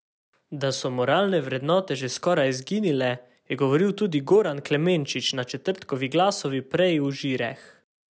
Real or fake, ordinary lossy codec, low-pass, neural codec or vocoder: real; none; none; none